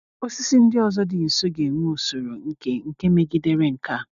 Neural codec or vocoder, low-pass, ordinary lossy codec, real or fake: none; 7.2 kHz; none; real